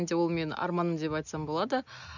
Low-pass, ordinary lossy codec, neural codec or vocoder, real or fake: 7.2 kHz; none; none; real